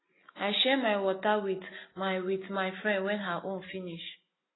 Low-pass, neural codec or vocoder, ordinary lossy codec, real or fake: 7.2 kHz; none; AAC, 16 kbps; real